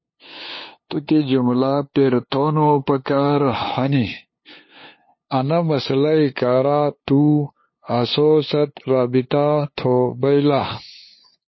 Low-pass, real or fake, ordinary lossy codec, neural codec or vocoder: 7.2 kHz; fake; MP3, 24 kbps; codec, 16 kHz, 2 kbps, FunCodec, trained on LibriTTS, 25 frames a second